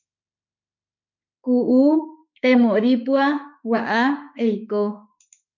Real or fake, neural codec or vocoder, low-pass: fake; autoencoder, 48 kHz, 32 numbers a frame, DAC-VAE, trained on Japanese speech; 7.2 kHz